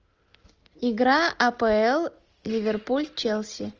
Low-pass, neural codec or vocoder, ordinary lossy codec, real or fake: 7.2 kHz; none; Opus, 24 kbps; real